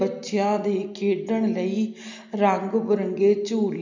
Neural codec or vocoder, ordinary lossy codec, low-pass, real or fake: none; MP3, 64 kbps; 7.2 kHz; real